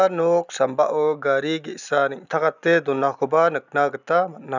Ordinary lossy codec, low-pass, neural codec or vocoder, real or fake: none; 7.2 kHz; none; real